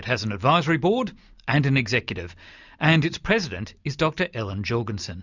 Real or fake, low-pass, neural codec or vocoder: real; 7.2 kHz; none